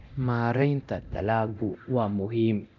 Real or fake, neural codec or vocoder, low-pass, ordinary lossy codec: fake; codec, 24 kHz, 0.9 kbps, DualCodec; 7.2 kHz; none